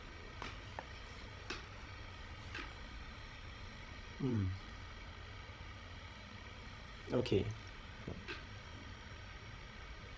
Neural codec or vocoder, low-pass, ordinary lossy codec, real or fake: codec, 16 kHz, 16 kbps, FreqCodec, larger model; none; none; fake